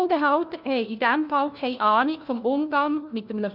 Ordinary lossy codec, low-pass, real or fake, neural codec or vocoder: none; 5.4 kHz; fake; codec, 16 kHz, 1 kbps, FunCodec, trained on LibriTTS, 50 frames a second